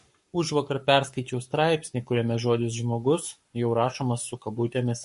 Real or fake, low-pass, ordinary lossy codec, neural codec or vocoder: fake; 14.4 kHz; MP3, 48 kbps; codec, 44.1 kHz, 7.8 kbps, Pupu-Codec